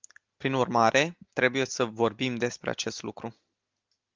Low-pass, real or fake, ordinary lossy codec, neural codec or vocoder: 7.2 kHz; real; Opus, 32 kbps; none